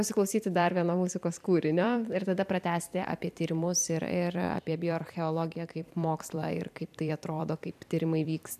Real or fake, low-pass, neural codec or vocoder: fake; 14.4 kHz; vocoder, 44.1 kHz, 128 mel bands every 256 samples, BigVGAN v2